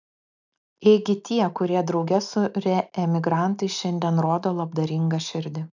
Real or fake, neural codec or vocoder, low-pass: real; none; 7.2 kHz